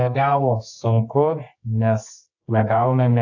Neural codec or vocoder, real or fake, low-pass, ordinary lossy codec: codec, 24 kHz, 0.9 kbps, WavTokenizer, medium music audio release; fake; 7.2 kHz; AAC, 48 kbps